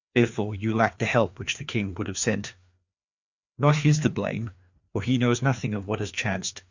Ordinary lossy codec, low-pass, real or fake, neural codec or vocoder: Opus, 64 kbps; 7.2 kHz; fake; codec, 16 kHz, 2 kbps, FreqCodec, larger model